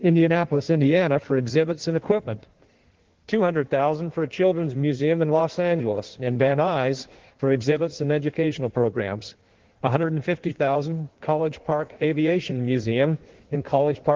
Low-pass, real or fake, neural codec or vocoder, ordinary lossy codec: 7.2 kHz; fake; codec, 16 kHz in and 24 kHz out, 1.1 kbps, FireRedTTS-2 codec; Opus, 16 kbps